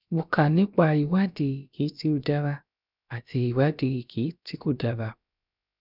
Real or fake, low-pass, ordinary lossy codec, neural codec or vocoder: fake; 5.4 kHz; none; codec, 16 kHz, about 1 kbps, DyCAST, with the encoder's durations